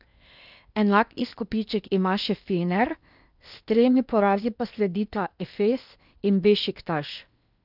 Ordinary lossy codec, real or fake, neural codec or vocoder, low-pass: none; fake; codec, 16 kHz in and 24 kHz out, 0.8 kbps, FocalCodec, streaming, 65536 codes; 5.4 kHz